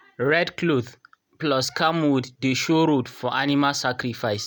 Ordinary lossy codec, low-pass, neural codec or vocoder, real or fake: none; none; none; real